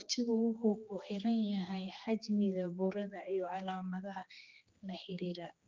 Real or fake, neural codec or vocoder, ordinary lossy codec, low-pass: fake; codec, 16 kHz, 2 kbps, X-Codec, HuBERT features, trained on general audio; Opus, 24 kbps; 7.2 kHz